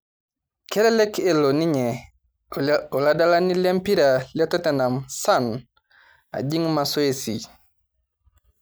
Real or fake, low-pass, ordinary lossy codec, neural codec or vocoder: real; none; none; none